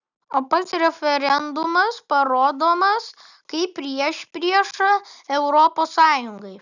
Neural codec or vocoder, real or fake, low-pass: none; real; 7.2 kHz